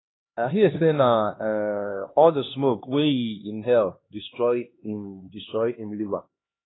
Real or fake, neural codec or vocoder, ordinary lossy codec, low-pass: fake; codec, 16 kHz, 2 kbps, X-Codec, HuBERT features, trained on LibriSpeech; AAC, 16 kbps; 7.2 kHz